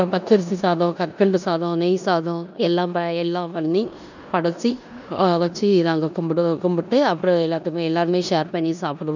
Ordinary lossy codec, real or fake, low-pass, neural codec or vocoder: none; fake; 7.2 kHz; codec, 16 kHz in and 24 kHz out, 0.9 kbps, LongCat-Audio-Codec, four codebook decoder